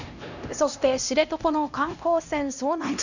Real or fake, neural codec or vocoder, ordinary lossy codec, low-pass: fake; codec, 16 kHz, 1 kbps, X-Codec, HuBERT features, trained on LibriSpeech; none; 7.2 kHz